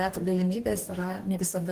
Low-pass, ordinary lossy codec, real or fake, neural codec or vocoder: 14.4 kHz; Opus, 16 kbps; fake; codec, 44.1 kHz, 2.6 kbps, DAC